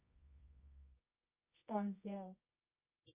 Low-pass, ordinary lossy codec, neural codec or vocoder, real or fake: 3.6 kHz; Opus, 64 kbps; codec, 24 kHz, 0.9 kbps, WavTokenizer, medium music audio release; fake